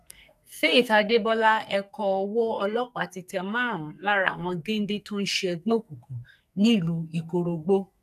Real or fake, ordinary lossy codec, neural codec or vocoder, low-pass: fake; none; codec, 44.1 kHz, 2.6 kbps, SNAC; 14.4 kHz